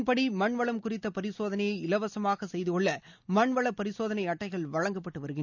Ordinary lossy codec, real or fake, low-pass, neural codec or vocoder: none; real; 7.2 kHz; none